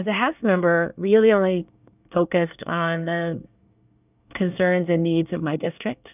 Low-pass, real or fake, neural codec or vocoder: 3.6 kHz; fake; codec, 24 kHz, 1 kbps, SNAC